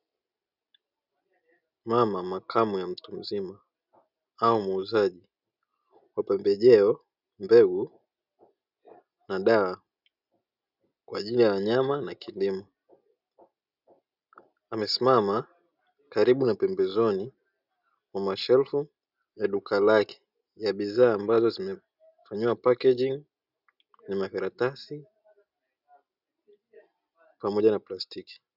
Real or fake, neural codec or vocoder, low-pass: real; none; 5.4 kHz